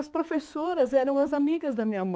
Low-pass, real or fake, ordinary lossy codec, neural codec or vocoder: none; fake; none; codec, 16 kHz, 4 kbps, X-Codec, HuBERT features, trained on balanced general audio